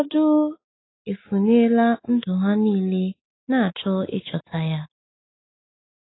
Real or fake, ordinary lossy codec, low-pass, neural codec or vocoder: real; AAC, 16 kbps; 7.2 kHz; none